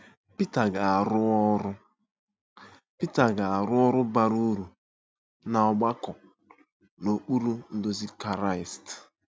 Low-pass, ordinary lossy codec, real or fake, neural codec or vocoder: none; none; real; none